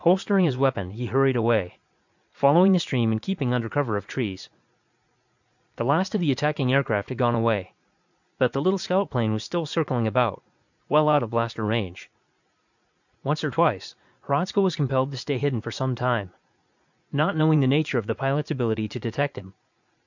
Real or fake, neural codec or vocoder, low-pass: fake; vocoder, 44.1 kHz, 80 mel bands, Vocos; 7.2 kHz